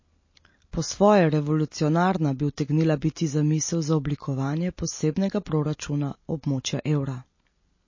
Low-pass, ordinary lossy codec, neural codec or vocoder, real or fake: 7.2 kHz; MP3, 32 kbps; none; real